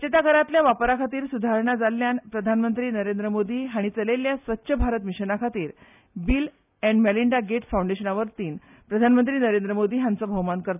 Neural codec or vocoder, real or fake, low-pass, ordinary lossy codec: none; real; 3.6 kHz; none